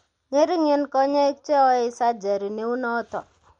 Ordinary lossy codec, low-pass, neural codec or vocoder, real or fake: MP3, 48 kbps; 9.9 kHz; none; real